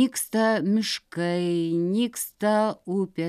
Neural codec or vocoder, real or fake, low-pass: none; real; 14.4 kHz